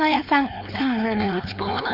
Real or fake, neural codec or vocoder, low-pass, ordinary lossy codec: fake; codec, 16 kHz, 4.8 kbps, FACodec; 5.4 kHz; MP3, 48 kbps